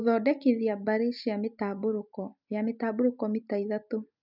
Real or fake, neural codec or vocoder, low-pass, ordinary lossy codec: real; none; 5.4 kHz; none